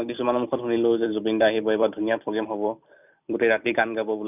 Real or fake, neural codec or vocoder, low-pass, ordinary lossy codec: fake; vocoder, 44.1 kHz, 128 mel bands every 256 samples, BigVGAN v2; 3.6 kHz; none